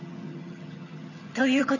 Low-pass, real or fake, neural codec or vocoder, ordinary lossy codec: 7.2 kHz; fake; vocoder, 22.05 kHz, 80 mel bands, HiFi-GAN; none